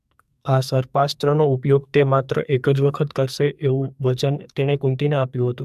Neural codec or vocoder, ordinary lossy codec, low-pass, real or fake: codec, 44.1 kHz, 2.6 kbps, SNAC; MP3, 96 kbps; 14.4 kHz; fake